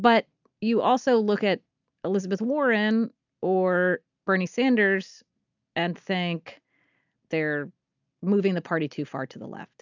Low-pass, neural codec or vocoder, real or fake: 7.2 kHz; none; real